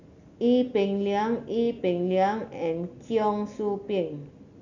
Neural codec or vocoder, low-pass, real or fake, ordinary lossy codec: none; 7.2 kHz; real; none